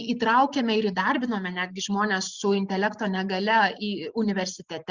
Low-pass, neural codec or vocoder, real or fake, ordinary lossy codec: 7.2 kHz; none; real; Opus, 64 kbps